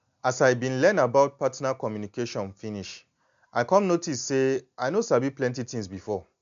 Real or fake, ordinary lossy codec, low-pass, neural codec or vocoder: real; MP3, 64 kbps; 7.2 kHz; none